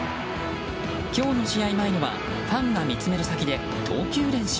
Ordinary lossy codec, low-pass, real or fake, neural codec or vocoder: none; none; real; none